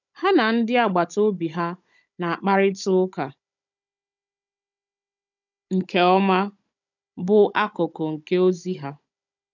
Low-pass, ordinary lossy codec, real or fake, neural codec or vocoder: 7.2 kHz; none; fake; codec, 16 kHz, 16 kbps, FunCodec, trained on Chinese and English, 50 frames a second